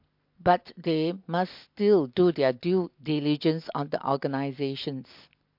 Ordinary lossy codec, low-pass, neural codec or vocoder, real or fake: MP3, 48 kbps; 5.4 kHz; none; real